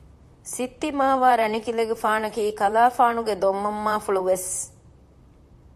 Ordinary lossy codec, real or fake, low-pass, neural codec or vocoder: MP3, 64 kbps; fake; 14.4 kHz; vocoder, 44.1 kHz, 128 mel bands, Pupu-Vocoder